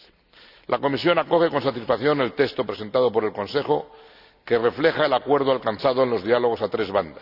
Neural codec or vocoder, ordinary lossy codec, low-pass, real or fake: none; none; 5.4 kHz; real